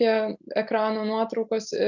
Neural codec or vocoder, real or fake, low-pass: none; real; 7.2 kHz